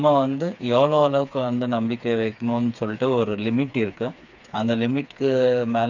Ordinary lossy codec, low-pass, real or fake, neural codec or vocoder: none; 7.2 kHz; fake; codec, 16 kHz, 4 kbps, FreqCodec, smaller model